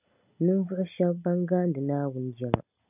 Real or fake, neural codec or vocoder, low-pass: real; none; 3.6 kHz